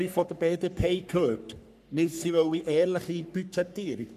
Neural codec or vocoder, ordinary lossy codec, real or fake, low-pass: codec, 44.1 kHz, 3.4 kbps, Pupu-Codec; none; fake; 14.4 kHz